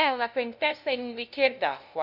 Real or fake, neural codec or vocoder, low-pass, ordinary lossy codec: fake; codec, 16 kHz, 0.5 kbps, FunCodec, trained on LibriTTS, 25 frames a second; 5.4 kHz; none